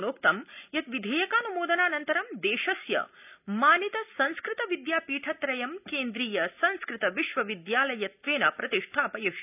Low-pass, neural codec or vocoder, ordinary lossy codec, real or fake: 3.6 kHz; none; none; real